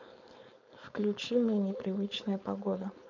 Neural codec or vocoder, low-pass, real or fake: codec, 16 kHz, 4.8 kbps, FACodec; 7.2 kHz; fake